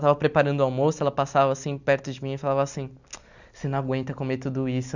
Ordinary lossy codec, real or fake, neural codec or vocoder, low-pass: none; real; none; 7.2 kHz